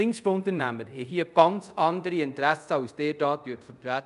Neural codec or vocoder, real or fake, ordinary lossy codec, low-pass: codec, 24 kHz, 0.5 kbps, DualCodec; fake; none; 10.8 kHz